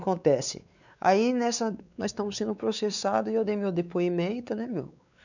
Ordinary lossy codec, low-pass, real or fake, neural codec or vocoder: none; 7.2 kHz; fake; codec, 16 kHz, 4 kbps, X-Codec, WavLM features, trained on Multilingual LibriSpeech